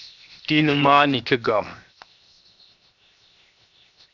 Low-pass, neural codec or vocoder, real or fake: 7.2 kHz; codec, 16 kHz, 0.7 kbps, FocalCodec; fake